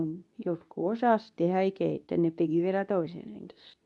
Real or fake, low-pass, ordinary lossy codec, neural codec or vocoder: fake; none; none; codec, 24 kHz, 0.9 kbps, WavTokenizer, medium speech release version 2